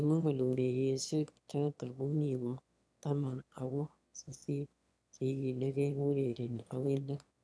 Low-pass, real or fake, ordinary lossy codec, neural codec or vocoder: none; fake; none; autoencoder, 22.05 kHz, a latent of 192 numbers a frame, VITS, trained on one speaker